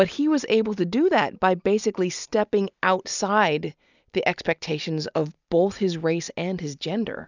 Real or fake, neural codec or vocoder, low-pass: real; none; 7.2 kHz